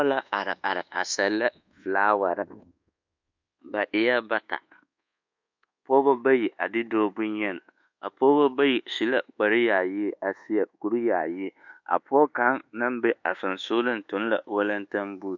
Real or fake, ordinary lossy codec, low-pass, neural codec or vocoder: fake; MP3, 64 kbps; 7.2 kHz; codec, 24 kHz, 1.2 kbps, DualCodec